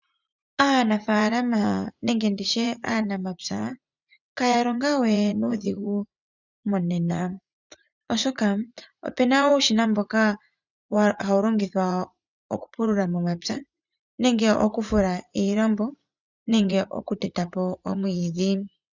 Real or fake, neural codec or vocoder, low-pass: fake; vocoder, 44.1 kHz, 80 mel bands, Vocos; 7.2 kHz